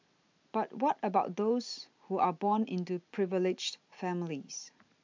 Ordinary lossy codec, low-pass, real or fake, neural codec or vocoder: MP3, 64 kbps; 7.2 kHz; real; none